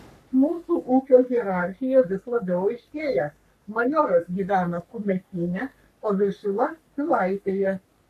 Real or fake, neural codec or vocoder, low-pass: fake; codec, 44.1 kHz, 3.4 kbps, Pupu-Codec; 14.4 kHz